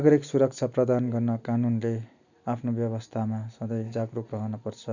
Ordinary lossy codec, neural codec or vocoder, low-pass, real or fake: none; none; 7.2 kHz; real